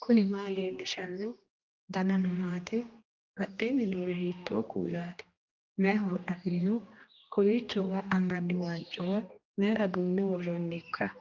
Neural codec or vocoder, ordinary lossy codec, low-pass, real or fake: codec, 16 kHz, 1 kbps, X-Codec, HuBERT features, trained on general audio; Opus, 32 kbps; 7.2 kHz; fake